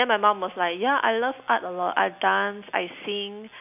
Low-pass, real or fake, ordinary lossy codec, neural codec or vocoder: 3.6 kHz; real; none; none